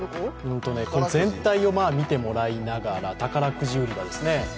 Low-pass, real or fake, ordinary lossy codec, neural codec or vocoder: none; real; none; none